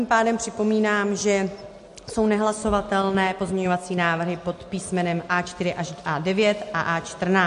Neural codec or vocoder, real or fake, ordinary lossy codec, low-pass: none; real; MP3, 48 kbps; 14.4 kHz